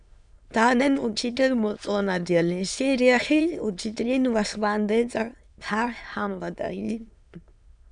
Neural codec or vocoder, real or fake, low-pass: autoencoder, 22.05 kHz, a latent of 192 numbers a frame, VITS, trained on many speakers; fake; 9.9 kHz